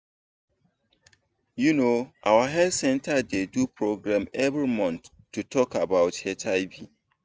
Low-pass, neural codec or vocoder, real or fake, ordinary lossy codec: none; none; real; none